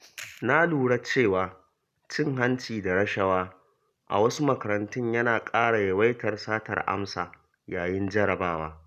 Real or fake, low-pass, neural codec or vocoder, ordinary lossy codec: real; 14.4 kHz; none; none